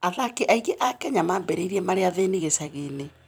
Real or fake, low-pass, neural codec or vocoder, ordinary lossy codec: fake; none; vocoder, 44.1 kHz, 128 mel bands every 256 samples, BigVGAN v2; none